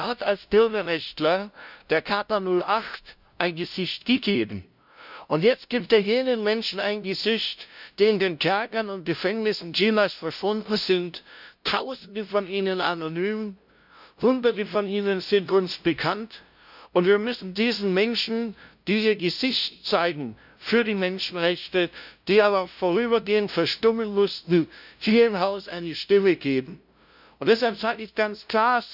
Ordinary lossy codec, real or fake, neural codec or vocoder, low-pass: none; fake; codec, 16 kHz, 0.5 kbps, FunCodec, trained on LibriTTS, 25 frames a second; 5.4 kHz